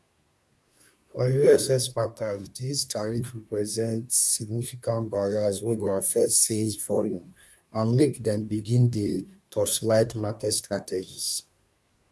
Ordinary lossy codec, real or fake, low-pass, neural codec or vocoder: none; fake; none; codec, 24 kHz, 1 kbps, SNAC